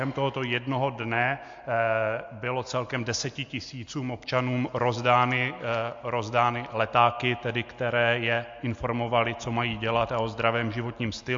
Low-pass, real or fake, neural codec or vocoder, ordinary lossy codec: 7.2 kHz; real; none; MP3, 48 kbps